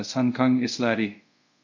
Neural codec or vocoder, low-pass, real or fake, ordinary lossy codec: codec, 24 kHz, 0.5 kbps, DualCodec; 7.2 kHz; fake; none